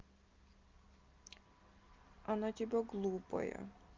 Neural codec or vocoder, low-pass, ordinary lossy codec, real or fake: none; 7.2 kHz; Opus, 24 kbps; real